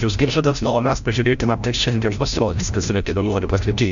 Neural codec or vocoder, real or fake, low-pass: codec, 16 kHz, 0.5 kbps, FreqCodec, larger model; fake; 7.2 kHz